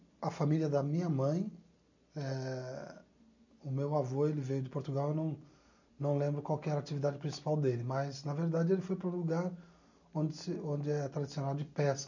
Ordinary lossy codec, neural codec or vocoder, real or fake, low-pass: none; none; real; 7.2 kHz